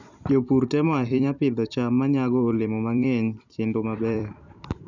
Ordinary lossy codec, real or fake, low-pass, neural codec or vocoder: none; fake; 7.2 kHz; vocoder, 44.1 kHz, 128 mel bands every 256 samples, BigVGAN v2